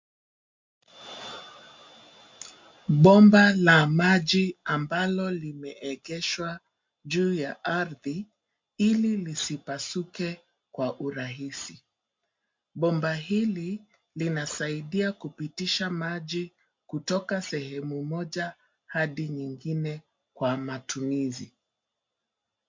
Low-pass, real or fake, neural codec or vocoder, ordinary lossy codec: 7.2 kHz; real; none; MP3, 48 kbps